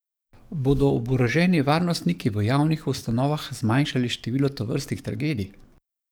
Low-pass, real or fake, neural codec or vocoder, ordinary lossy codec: none; fake; codec, 44.1 kHz, 7.8 kbps, DAC; none